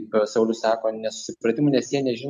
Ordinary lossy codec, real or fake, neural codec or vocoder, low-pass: MP3, 64 kbps; real; none; 14.4 kHz